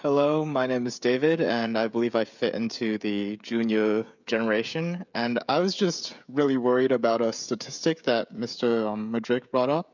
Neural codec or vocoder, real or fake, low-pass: codec, 16 kHz, 16 kbps, FreqCodec, smaller model; fake; 7.2 kHz